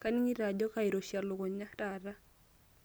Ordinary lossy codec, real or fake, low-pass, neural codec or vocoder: none; real; none; none